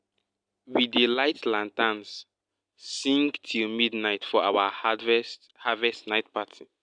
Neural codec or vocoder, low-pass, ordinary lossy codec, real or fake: none; 9.9 kHz; none; real